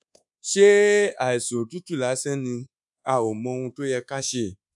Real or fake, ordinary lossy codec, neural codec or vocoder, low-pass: fake; none; codec, 24 kHz, 1.2 kbps, DualCodec; 10.8 kHz